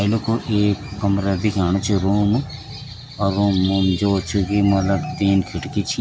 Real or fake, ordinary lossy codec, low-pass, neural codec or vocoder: real; none; none; none